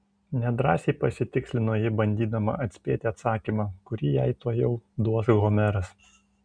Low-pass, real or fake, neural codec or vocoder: 9.9 kHz; real; none